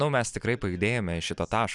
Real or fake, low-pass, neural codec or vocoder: real; 10.8 kHz; none